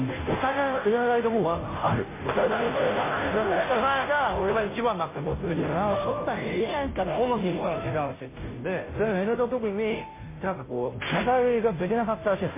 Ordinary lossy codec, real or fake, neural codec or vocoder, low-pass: MP3, 16 kbps; fake; codec, 16 kHz, 0.5 kbps, FunCodec, trained on Chinese and English, 25 frames a second; 3.6 kHz